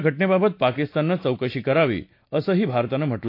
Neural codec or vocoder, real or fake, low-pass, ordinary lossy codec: none; real; 5.4 kHz; AAC, 32 kbps